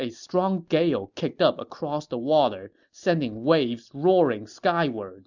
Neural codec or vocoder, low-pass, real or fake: none; 7.2 kHz; real